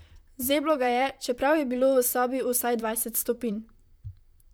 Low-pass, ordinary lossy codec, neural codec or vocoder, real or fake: none; none; vocoder, 44.1 kHz, 128 mel bands, Pupu-Vocoder; fake